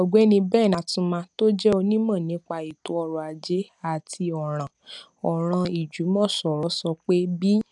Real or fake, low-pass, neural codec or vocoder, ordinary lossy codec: real; 10.8 kHz; none; none